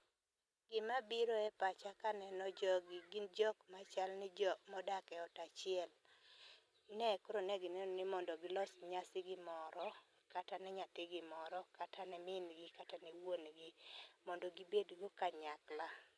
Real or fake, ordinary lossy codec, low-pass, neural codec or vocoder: real; none; none; none